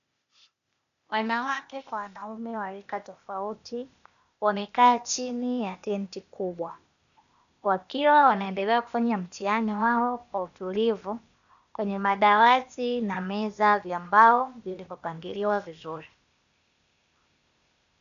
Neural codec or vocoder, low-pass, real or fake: codec, 16 kHz, 0.8 kbps, ZipCodec; 7.2 kHz; fake